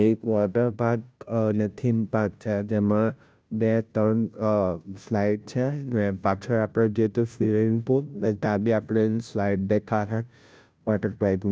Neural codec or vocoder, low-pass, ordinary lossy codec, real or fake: codec, 16 kHz, 0.5 kbps, FunCodec, trained on Chinese and English, 25 frames a second; none; none; fake